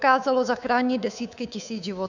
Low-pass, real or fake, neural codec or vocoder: 7.2 kHz; fake; codec, 24 kHz, 3.1 kbps, DualCodec